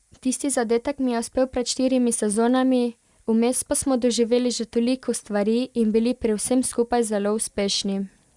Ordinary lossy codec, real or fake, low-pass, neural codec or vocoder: Opus, 64 kbps; real; 10.8 kHz; none